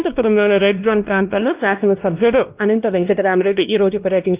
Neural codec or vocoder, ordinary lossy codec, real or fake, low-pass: codec, 16 kHz, 1 kbps, X-Codec, WavLM features, trained on Multilingual LibriSpeech; Opus, 32 kbps; fake; 3.6 kHz